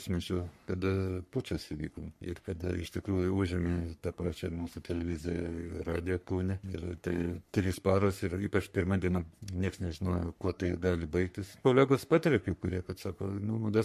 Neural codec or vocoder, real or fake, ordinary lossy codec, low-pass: codec, 44.1 kHz, 3.4 kbps, Pupu-Codec; fake; MP3, 64 kbps; 14.4 kHz